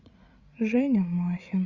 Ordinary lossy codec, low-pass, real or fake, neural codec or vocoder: Opus, 64 kbps; 7.2 kHz; fake; codec, 16 kHz, 8 kbps, FreqCodec, larger model